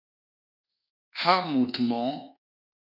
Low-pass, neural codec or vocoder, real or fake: 5.4 kHz; codec, 24 kHz, 1.2 kbps, DualCodec; fake